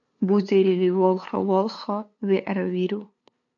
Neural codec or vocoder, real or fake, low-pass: codec, 16 kHz, 2 kbps, FunCodec, trained on LibriTTS, 25 frames a second; fake; 7.2 kHz